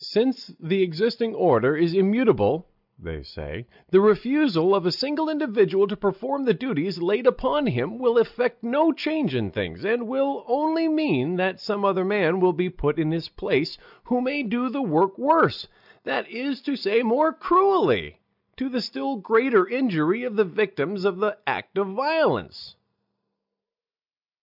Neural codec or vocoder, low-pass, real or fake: none; 5.4 kHz; real